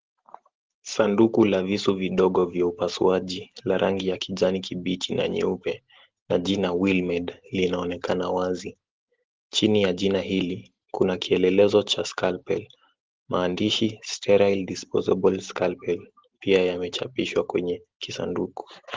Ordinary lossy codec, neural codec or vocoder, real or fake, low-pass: Opus, 16 kbps; none; real; 7.2 kHz